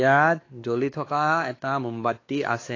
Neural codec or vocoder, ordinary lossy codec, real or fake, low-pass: codec, 16 kHz, 2 kbps, X-Codec, WavLM features, trained on Multilingual LibriSpeech; AAC, 32 kbps; fake; 7.2 kHz